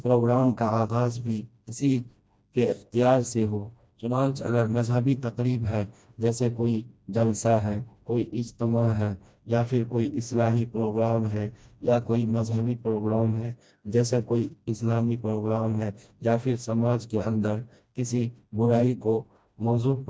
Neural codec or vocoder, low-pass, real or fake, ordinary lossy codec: codec, 16 kHz, 1 kbps, FreqCodec, smaller model; none; fake; none